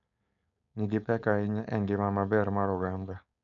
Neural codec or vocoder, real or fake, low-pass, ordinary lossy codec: codec, 16 kHz, 4.8 kbps, FACodec; fake; 7.2 kHz; none